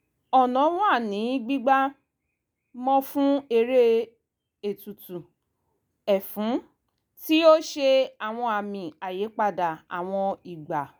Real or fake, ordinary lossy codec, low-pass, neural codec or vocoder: real; none; none; none